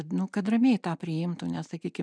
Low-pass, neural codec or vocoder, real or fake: 9.9 kHz; none; real